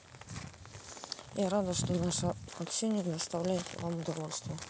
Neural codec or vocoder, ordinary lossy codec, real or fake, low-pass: none; none; real; none